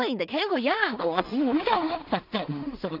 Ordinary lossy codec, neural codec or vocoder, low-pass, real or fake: none; codec, 16 kHz in and 24 kHz out, 0.4 kbps, LongCat-Audio-Codec, two codebook decoder; 5.4 kHz; fake